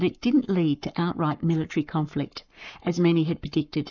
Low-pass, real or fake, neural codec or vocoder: 7.2 kHz; fake; codec, 44.1 kHz, 7.8 kbps, Pupu-Codec